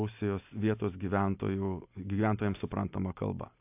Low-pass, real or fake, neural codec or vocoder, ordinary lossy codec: 3.6 kHz; real; none; AAC, 32 kbps